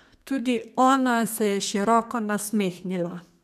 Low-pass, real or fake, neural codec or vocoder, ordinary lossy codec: 14.4 kHz; fake; codec, 32 kHz, 1.9 kbps, SNAC; none